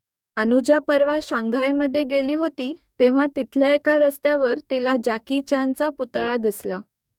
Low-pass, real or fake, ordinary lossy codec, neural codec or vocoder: 19.8 kHz; fake; none; codec, 44.1 kHz, 2.6 kbps, DAC